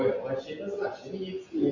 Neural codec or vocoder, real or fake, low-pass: none; real; 7.2 kHz